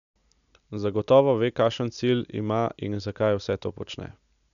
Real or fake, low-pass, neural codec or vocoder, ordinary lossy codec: real; 7.2 kHz; none; none